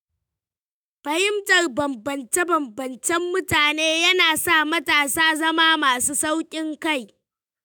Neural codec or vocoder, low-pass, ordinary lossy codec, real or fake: autoencoder, 48 kHz, 128 numbers a frame, DAC-VAE, trained on Japanese speech; none; none; fake